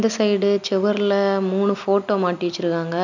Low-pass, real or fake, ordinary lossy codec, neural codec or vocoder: 7.2 kHz; real; none; none